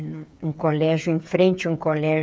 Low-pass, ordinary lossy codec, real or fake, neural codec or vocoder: none; none; fake; codec, 16 kHz, 8 kbps, FreqCodec, smaller model